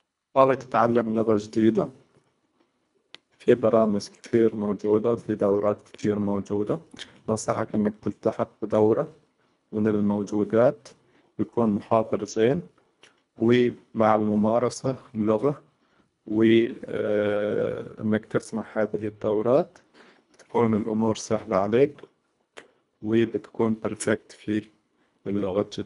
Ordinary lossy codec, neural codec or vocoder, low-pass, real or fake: none; codec, 24 kHz, 1.5 kbps, HILCodec; 10.8 kHz; fake